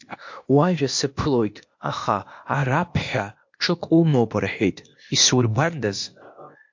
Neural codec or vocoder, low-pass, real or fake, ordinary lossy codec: codec, 16 kHz, 0.8 kbps, ZipCodec; 7.2 kHz; fake; MP3, 48 kbps